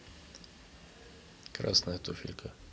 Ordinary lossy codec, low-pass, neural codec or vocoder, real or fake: none; none; none; real